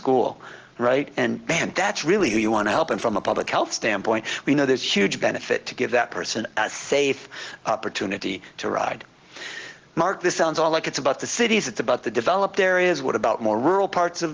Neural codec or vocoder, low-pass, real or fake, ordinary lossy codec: none; 7.2 kHz; real; Opus, 16 kbps